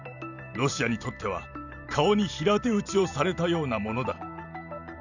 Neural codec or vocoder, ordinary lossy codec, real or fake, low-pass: vocoder, 44.1 kHz, 128 mel bands every 512 samples, BigVGAN v2; none; fake; 7.2 kHz